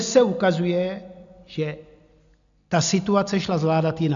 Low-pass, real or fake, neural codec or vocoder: 7.2 kHz; real; none